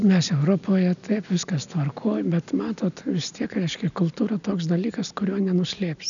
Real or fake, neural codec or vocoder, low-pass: real; none; 7.2 kHz